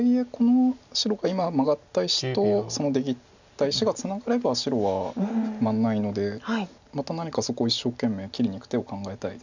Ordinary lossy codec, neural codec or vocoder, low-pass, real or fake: none; none; 7.2 kHz; real